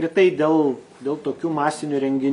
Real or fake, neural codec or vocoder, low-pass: real; none; 10.8 kHz